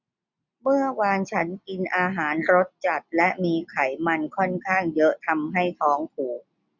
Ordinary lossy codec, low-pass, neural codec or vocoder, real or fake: none; 7.2 kHz; none; real